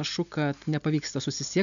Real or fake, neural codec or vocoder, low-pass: real; none; 7.2 kHz